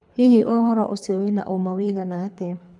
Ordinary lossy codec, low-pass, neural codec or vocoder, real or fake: none; 10.8 kHz; codec, 24 kHz, 3 kbps, HILCodec; fake